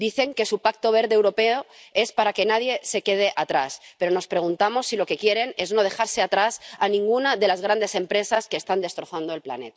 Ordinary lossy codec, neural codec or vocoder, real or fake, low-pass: none; none; real; none